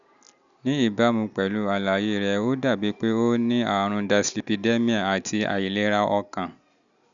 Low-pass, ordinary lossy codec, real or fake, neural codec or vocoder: 7.2 kHz; none; real; none